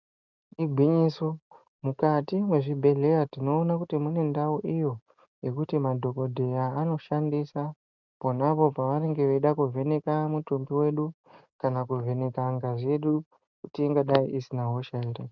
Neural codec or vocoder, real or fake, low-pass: none; real; 7.2 kHz